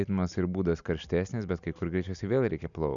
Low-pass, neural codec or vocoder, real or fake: 7.2 kHz; none; real